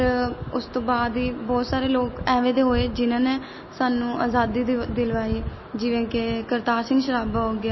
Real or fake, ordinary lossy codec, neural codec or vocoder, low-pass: real; MP3, 24 kbps; none; 7.2 kHz